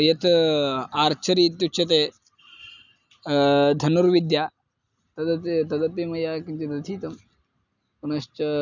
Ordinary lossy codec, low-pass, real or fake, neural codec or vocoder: none; 7.2 kHz; real; none